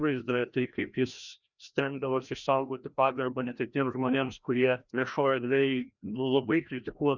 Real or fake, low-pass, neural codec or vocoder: fake; 7.2 kHz; codec, 16 kHz, 1 kbps, FreqCodec, larger model